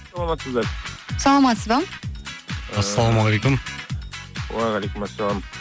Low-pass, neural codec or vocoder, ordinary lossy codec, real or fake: none; none; none; real